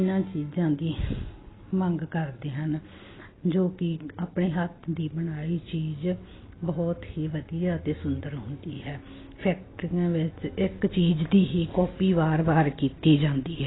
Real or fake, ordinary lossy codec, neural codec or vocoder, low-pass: real; AAC, 16 kbps; none; 7.2 kHz